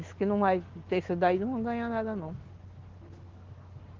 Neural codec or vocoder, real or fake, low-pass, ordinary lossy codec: none; real; 7.2 kHz; Opus, 16 kbps